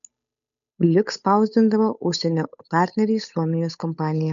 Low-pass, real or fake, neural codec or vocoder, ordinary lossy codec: 7.2 kHz; fake; codec, 16 kHz, 8 kbps, FunCodec, trained on Chinese and English, 25 frames a second; MP3, 96 kbps